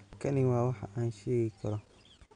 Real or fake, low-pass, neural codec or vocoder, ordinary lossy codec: real; 9.9 kHz; none; none